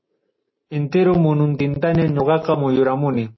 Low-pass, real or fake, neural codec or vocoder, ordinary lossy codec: 7.2 kHz; real; none; MP3, 24 kbps